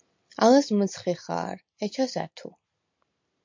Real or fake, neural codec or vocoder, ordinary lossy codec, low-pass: real; none; MP3, 48 kbps; 7.2 kHz